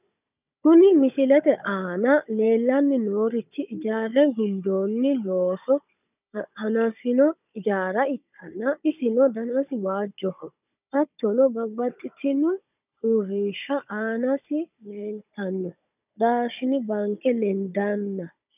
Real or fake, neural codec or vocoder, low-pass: fake; codec, 16 kHz, 16 kbps, FunCodec, trained on Chinese and English, 50 frames a second; 3.6 kHz